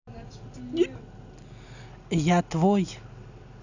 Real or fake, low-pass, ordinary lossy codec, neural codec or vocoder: real; 7.2 kHz; none; none